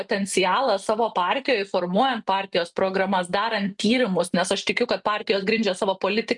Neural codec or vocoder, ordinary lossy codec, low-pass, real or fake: none; MP3, 96 kbps; 10.8 kHz; real